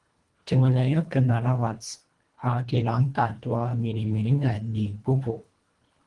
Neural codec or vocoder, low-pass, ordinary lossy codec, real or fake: codec, 24 kHz, 1.5 kbps, HILCodec; 10.8 kHz; Opus, 24 kbps; fake